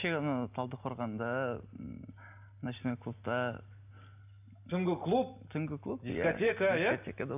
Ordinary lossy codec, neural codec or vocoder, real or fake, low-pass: none; none; real; 3.6 kHz